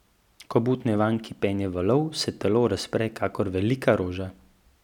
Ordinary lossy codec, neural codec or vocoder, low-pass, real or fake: none; none; 19.8 kHz; real